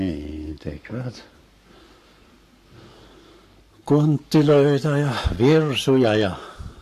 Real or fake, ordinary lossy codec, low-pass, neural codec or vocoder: fake; AAC, 96 kbps; 14.4 kHz; codec, 44.1 kHz, 7.8 kbps, Pupu-Codec